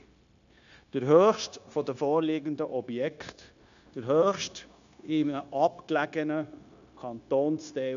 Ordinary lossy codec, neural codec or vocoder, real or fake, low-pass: AAC, 96 kbps; codec, 16 kHz, 0.9 kbps, LongCat-Audio-Codec; fake; 7.2 kHz